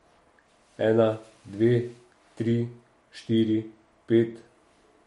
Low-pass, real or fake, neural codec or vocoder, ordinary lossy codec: 19.8 kHz; real; none; MP3, 48 kbps